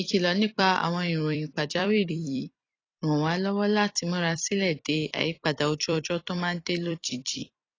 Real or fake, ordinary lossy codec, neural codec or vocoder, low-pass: fake; AAC, 32 kbps; vocoder, 44.1 kHz, 128 mel bands every 256 samples, BigVGAN v2; 7.2 kHz